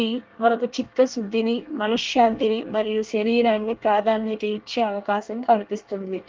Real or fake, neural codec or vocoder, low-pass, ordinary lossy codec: fake; codec, 24 kHz, 1 kbps, SNAC; 7.2 kHz; Opus, 32 kbps